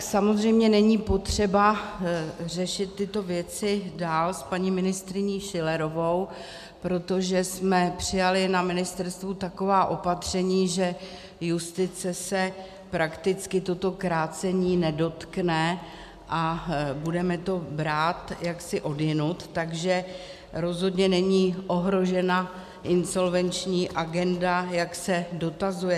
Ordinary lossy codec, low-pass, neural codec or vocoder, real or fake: MP3, 96 kbps; 14.4 kHz; none; real